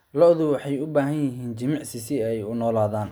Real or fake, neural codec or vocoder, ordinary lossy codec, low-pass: real; none; none; none